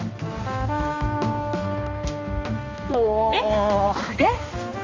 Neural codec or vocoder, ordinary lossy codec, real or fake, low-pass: codec, 16 kHz, 2 kbps, X-Codec, HuBERT features, trained on balanced general audio; Opus, 32 kbps; fake; 7.2 kHz